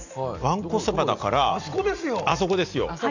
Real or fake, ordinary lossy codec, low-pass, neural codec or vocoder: real; none; 7.2 kHz; none